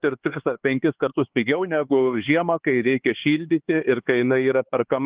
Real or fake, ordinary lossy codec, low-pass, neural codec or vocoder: fake; Opus, 16 kbps; 3.6 kHz; codec, 16 kHz, 4 kbps, X-Codec, HuBERT features, trained on LibriSpeech